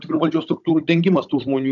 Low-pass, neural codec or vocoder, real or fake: 7.2 kHz; codec, 16 kHz, 16 kbps, FunCodec, trained on Chinese and English, 50 frames a second; fake